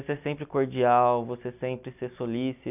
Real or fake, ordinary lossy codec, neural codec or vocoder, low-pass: real; none; none; 3.6 kHz